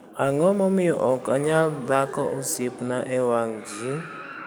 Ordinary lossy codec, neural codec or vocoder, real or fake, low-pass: none; codec, 44.1 kHz, 7.8 kbps, DAC; fake; none